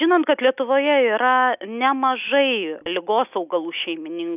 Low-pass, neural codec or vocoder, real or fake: 3.6 kHz; none; real